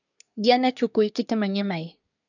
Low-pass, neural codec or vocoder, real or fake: 7.2 kHz; codec, 24 kHz, 1 kbps, SNAC; fake